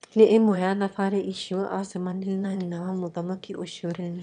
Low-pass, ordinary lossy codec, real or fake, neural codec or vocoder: 9.9 kHz; none; fake; autoencoder, 22.05 kHz, a latent of 192 numbers a frame, VITS, trained on one speaker